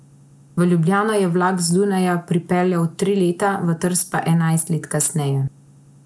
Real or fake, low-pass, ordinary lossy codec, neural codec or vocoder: real; none; none; none